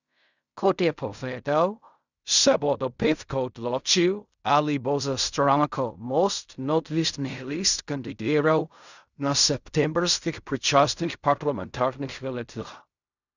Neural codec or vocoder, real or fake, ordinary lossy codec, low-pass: codec, 16 kHz in and 24 kHz out, 0.4 kbps, LongCat-Audio-Codec, fine tuned four codebook decoder; fake; none; 7.2 kHz